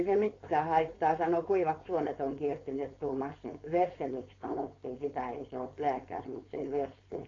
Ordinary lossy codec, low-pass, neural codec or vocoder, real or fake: AAC, 32 kbps; 7.2 kHz; codec, 16 kHz, 4.8 kbps, FACodec; fake